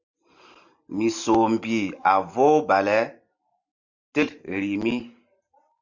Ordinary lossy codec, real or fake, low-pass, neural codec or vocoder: MP3, 64 kbps; fake; 7.2 kHz; vocoder, 44.1 kHz, 128 mel bands every 512 samples, BigVGAN v2